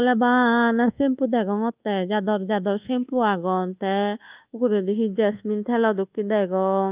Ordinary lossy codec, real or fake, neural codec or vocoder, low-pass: Opus, 24 kbps; fake; codec, 24 kHz, 1.2 kbps, DualCodec; 3.6 kHz